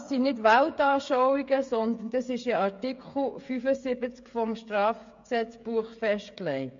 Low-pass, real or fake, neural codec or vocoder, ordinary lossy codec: 7.2 kHz; fake; codec, 16 kHz, 16 kbps, FreqCodec, smaller model; MP3, 48 kbps